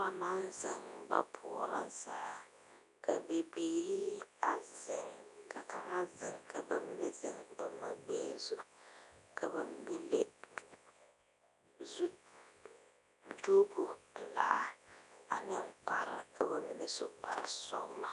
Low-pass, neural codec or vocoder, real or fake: 10.8 kHz; codec, 24 kHz, 0.9 kbps, WavTokenizer, large speech release; fake